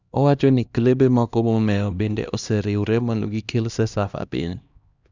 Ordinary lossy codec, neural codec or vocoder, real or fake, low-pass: Opus, 64 kbps; codec, 16 kHz, 1 kbps, X-Codec, HuBERT features, trained on LibriSpeech; fake; 7.2 kHz